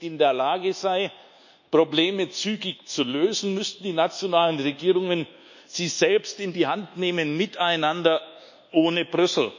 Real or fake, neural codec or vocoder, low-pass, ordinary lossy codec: fake; codec, 24 kHz, 1.2 kbps, DualCodec; 7.2 kHz; none